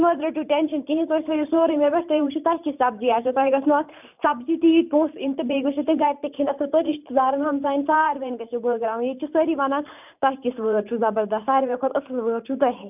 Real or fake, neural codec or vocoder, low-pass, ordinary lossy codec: fake; vocoder, 44.1 kHz, 128 mel bands every 512 samples, BigVGAN v2; 3.6 kHz; none